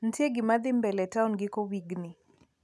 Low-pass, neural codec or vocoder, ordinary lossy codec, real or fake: none; none; none; real